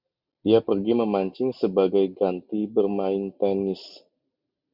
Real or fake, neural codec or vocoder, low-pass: real; none; 5.4 kHz